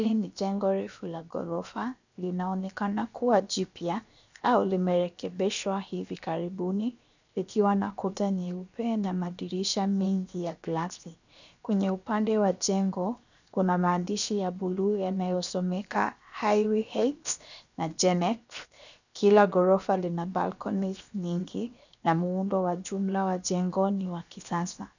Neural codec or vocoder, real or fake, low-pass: codec, 16 kHz, 0.7 kbps, FocalCodec; fake; 7.2 kHz